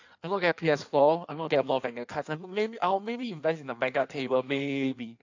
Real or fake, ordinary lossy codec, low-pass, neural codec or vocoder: fake; AAC, 48 kbps; 7.2 kHz; codec, 16 kHz in and 24 kHz out, 1.1 kbps, FireRedTTS-2 codec